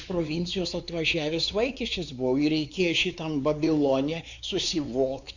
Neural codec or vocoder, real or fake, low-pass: codec, 16 kHz, 4 kbps, X-Codec, WavLM features, trained on Multilingual LibriSpeech; fake; 7.2 kHz